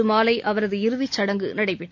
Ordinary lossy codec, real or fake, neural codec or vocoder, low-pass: MP3, 48 kbps; real; none; 7.2 kHz